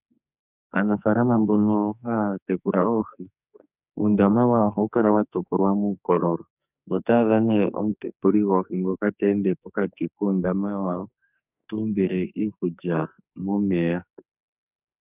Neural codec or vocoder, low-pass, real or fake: codec, 44.1 kHz, 2.6 kbps, SNAC; 3.6 kHz; fake